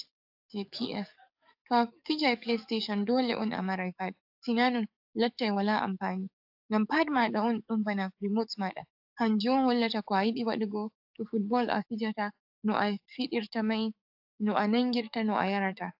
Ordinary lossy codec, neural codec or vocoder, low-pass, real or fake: AAC, 48 kbps; codec, 44.1 kHz, 7.8 kbps, DAC; 5.4 kHz; fake